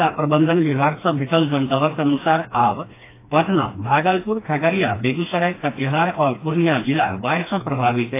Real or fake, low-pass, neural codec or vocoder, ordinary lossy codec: fake; 3.6 kHz; codec, 16 kHz, 2 kbps, FreqCodec, smaller model; AAC, 24 kbps